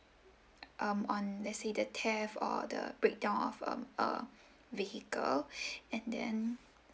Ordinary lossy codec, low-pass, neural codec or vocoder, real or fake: none; none; none; real